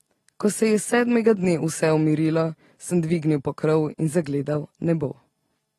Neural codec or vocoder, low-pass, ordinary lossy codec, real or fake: none; 19.8 kHz; AAC, 32 kbps; real